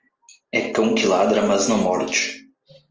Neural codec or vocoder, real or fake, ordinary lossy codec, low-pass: none; real; Opus, 24 kbps; 7.2 kHz